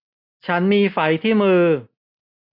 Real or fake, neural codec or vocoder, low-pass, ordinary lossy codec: real; none; 5.4 kHz; none